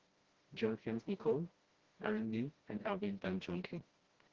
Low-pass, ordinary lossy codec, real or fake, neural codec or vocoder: 7.2 kHz; Opus, 16 kbps; fake; codec, 16 kHz, 1 kbps, FreqCodec, smaller model